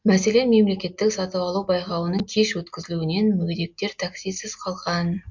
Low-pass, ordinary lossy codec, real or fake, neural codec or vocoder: 7.2 kHz; MP3, 64 kbps; real; none